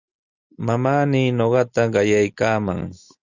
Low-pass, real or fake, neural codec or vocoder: 7.2 kHz; real; none